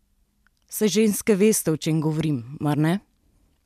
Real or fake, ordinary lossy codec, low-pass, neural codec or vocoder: real; MP3, 96 kbps; 14.4 kHz; none